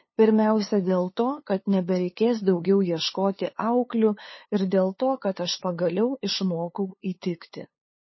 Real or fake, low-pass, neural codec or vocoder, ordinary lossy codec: fake; 7.2 kHz; codec, 16 kHz, 2 kbps, FunCodec, trained on LibriTTS, 25 frames a second; MP3, 24 kbps